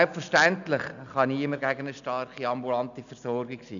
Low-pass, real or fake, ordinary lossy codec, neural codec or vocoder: 7.2 kHz; real; none; none